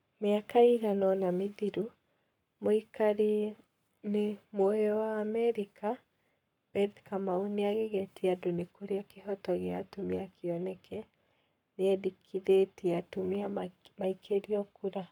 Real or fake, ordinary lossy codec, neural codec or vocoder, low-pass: fake; none; codec, 44.1 kHz, 7.8 kbps, Pupu-Codec; 19.8 kHz